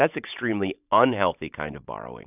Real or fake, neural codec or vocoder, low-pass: fake; vocoder, 44.1 kHz, 128 mel bands every 512 samples, BigVGAN v2; 3.6 kHz